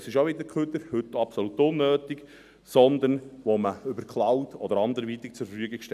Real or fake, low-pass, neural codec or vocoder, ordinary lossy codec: real; 14.4 kHz; none; none